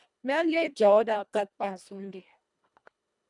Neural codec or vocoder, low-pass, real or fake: codec, 24 kHz, 1.5 kbps, HILCodec; 10.8 kHz; fake